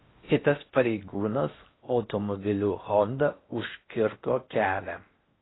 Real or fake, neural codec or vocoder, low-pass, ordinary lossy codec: fake; codec, 16 kHz in and 24 kHz out, 0.6 kbps, FocalCodec, streaming, 4096 codes; 7.2 kHz; AAC, 16 kbps